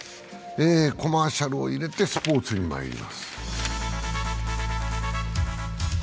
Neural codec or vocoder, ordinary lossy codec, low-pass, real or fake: none; none; none; real